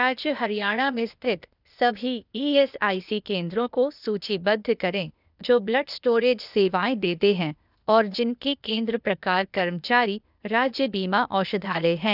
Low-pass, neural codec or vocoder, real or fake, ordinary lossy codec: 5.4 kHz; codec, 16 kHz, 0.8 kbps, ZipCodec; fake; none